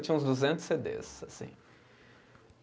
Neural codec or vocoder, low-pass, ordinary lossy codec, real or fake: none; none; none; real